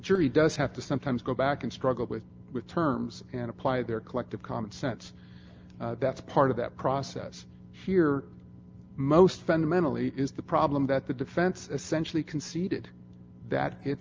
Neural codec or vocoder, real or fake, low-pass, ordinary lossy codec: none; real; 7.2 kHz; Opus, 24 kbps